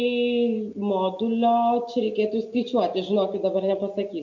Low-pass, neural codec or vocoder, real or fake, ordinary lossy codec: 7.2 kHz; none; real; MP3, 48 kbps